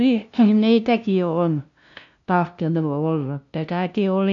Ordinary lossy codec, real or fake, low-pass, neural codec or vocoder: none; fake; 7.2 kHz; codec, 16 kHz, 0.5 kbps, FunCodec, trained on LibriTTS, 25 frames a second